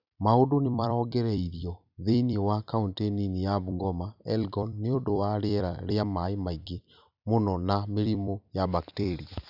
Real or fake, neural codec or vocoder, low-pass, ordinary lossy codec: fake; vocoder, 44.1 kHz, 128 mel bands every 256 samples, BigVGAN v2; 5.4 kHz; none